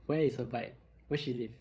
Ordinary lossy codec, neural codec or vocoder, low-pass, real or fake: none; codec, 16 kHz, 16 kbps, FreqCodec, larger model; none; fake